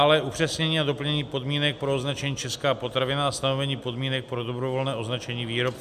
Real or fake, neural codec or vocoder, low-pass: real; none; 14.4 kHz